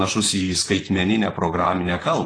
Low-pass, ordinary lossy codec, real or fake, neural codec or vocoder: 9.9 kHz; AAC, 32 kbps; fake; vocoder, 44.1 kHz, 128 mel bands, Pupu-Vocoder